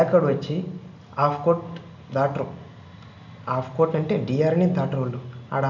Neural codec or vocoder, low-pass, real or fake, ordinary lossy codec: none; 7.2 kHz; real; none